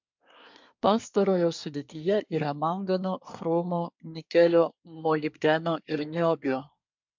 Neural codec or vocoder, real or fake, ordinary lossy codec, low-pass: codec, 24 kHz, 1 kbps, SNAC; fake; MP3, 64 kbps; 7.2 kHz